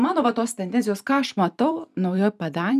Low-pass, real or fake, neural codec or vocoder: 14.4 kHz; fake; vocoder, 44.1 kHz, 128 mel bands every 512 samples, BigVGAN v2